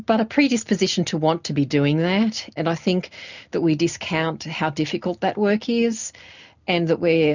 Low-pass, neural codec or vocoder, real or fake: 7.2 kHz; none; real